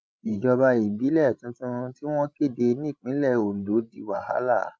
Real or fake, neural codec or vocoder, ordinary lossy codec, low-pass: fake; codec, 16 kHz, 16 kbps, FreqCodec, larger model; none; none